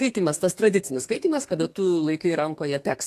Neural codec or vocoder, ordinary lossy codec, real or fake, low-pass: codec, 32 kHz, 1.9 kbps, SNAC; AAC, 64 kbps; fake; 14.4 kHz